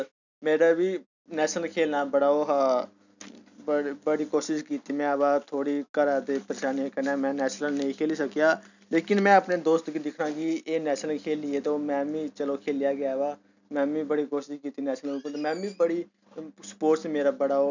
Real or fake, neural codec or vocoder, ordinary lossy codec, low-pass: real; none; none; 7.2 kHz